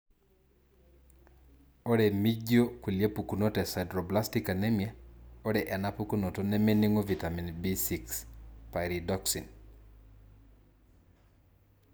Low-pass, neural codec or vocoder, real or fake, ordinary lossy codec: none; none; real; none